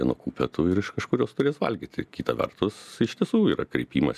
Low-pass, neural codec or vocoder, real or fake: 14.4 kHz; none; real